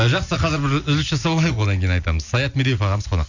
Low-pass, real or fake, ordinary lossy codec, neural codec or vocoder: 7.2 kHz; real; none; none